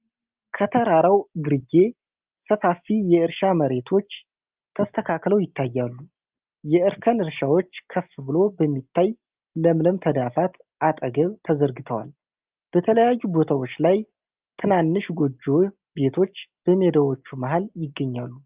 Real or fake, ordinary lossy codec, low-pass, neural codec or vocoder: real; Opus, 32 kbps; 3.6 kHz; none